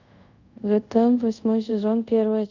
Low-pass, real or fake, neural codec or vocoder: 7.2 kHz; fake; codec, 24 kHz, 0.5 kbps, DualCodec